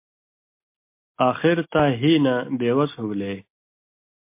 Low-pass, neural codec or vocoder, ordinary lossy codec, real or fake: 3.6 kHz; none; MP3, 24 kbps; real